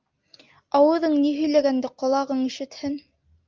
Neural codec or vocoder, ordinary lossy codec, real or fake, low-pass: none; Opus, 32 kbps; real; 7.2 kHz